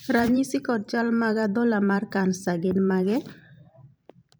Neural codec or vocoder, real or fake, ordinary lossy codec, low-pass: vocoder, 44.1 kHz, 128 mel bands every 512 samples, BigVGAN v2; fake; none; none